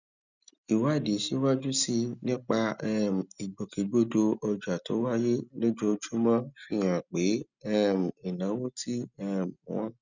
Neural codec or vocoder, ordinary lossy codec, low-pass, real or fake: none; none; 7.2 kHz; real